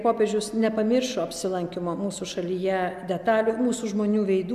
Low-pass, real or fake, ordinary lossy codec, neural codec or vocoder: 14.4 kHz; real; AAC, 96 kbps; none